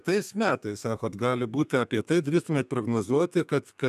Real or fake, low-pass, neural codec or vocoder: fake; 14.4 kHz; codec, 32 kHz, 1.9 kbps, SNAC